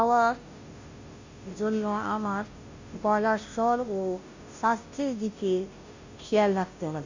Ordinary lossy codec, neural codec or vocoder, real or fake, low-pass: Opus, 64 kbps; codec, 16 kHz, 0.5 kbps, FunCodec, trained on Chinese and English, 25 frames a second; fake; 7.2 kHz